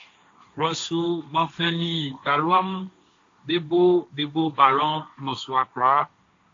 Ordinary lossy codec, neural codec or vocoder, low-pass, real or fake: AAC, 48 kbps; codec, 16 kHz, 1.1 kbps, Voila-Tokenizer; 7.2 kHz; fake